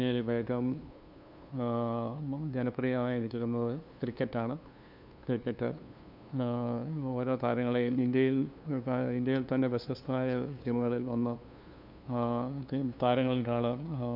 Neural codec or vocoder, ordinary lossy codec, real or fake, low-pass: codec, 16 kHz, 2 kbps, FunCodec, trained on LibriTTS, 25 frames a second; none; fake; 5.4 kHz